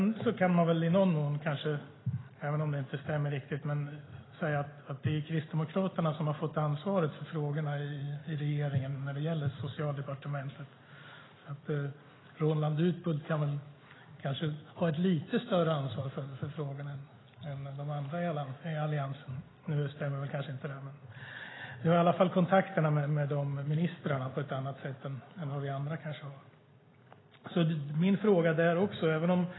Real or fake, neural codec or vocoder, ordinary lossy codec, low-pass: real; none; AAC, 16 kbps; 7.2 kHz